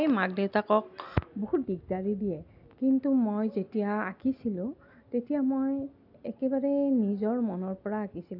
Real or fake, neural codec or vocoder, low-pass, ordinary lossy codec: real; none; 5.4 kHz; AAC, 48 kbps